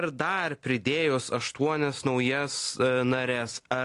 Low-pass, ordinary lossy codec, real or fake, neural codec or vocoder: 10.8 kHz; AAC, 48 kbps; real; none